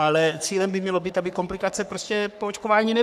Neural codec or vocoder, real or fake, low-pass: codec, 44.1 kHz, 3.4 kbps, Pupu-Codec; fake; 14.4 kHz